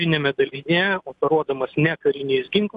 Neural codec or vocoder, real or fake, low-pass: none; real; 10.8 kHz